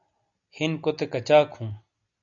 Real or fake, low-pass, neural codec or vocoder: real; 7.2 kHz; none